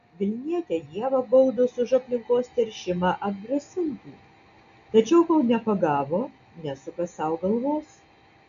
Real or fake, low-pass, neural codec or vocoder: real; 7.2 kHz; none